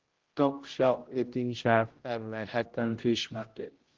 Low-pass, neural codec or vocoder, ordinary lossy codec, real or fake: 7.2 kHz; codec, 16 kHz, 0.5 kbps, X-Codec, HuBERT features, trained on general audio; Opus, 16 kbps; fake